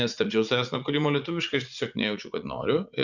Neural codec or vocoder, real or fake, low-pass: vocoder, 44.1 kHz, 80 mel bands, Vocos; fake; 7.2 kHz